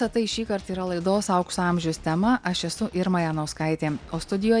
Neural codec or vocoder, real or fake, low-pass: none; real; 9.9 kHz